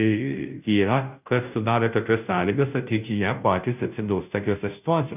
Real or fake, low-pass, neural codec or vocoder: fake; 3.6 kHz; codec, 16 kHz, 0.5 kbps, FunCodec, trained on Chinese and English, 25 frames a second